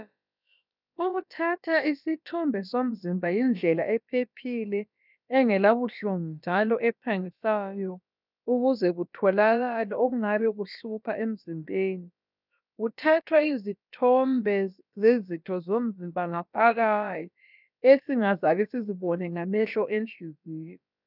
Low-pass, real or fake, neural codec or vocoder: 5.4 kHz; fake; codec, 16 kHz, about 1 kbps, DyCAST, with the encoder's durations